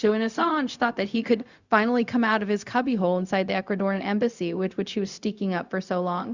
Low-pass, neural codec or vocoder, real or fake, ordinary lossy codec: 7.2 kHz; codec, 16 kHz, 0.4 kbps, LongCat-Audio-Codec; fake; Opus, 64 kbps